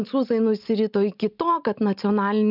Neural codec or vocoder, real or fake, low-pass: none; real; 5.4 kHz